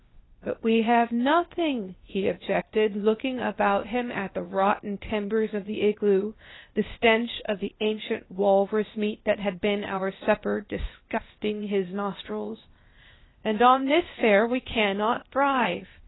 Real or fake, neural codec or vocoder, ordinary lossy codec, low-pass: fake; codec, 16 kHz, 0.8 kbps, ZipCodec; AAC, 16 kbps; 7.2 kHz